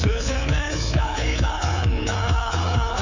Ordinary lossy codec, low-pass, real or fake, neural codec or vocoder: none; 7.2 kHz; fake; codec, 24 kHz, 3.1 kbps, DualCodec